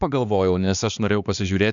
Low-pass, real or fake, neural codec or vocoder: 7.2 kHz; fake; codec, 16 kHz, 2 kbps, X-Codec, HuBERT features, trained on balanced general audio